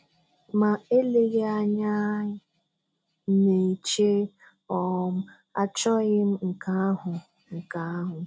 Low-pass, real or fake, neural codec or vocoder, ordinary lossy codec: none; real; none; none